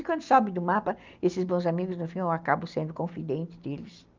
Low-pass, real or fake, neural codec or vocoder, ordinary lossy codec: 7.2 kHz; real; none; Opus, 24 kbps